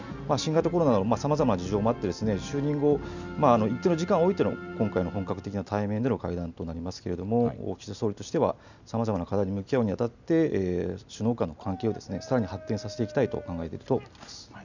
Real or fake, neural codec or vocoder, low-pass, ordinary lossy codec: real; none; 7.2 kHz; none